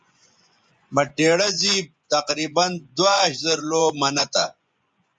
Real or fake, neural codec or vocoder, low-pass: fake; vocoder, 24 kHz, 100 mel bands, Vocos; 9.9 kHz